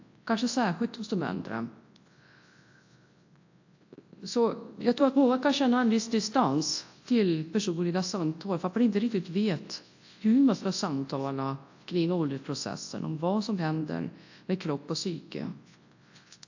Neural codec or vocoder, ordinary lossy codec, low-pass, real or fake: codec, 24 kHz, 0.9 kbps, WavTokenizer, large speech release; AAC, 48 kbps; 7.2 kHz; fake